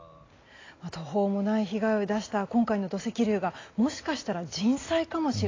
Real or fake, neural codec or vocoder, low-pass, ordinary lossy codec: real; none; 7.2 kHz; AAC, 32 kbps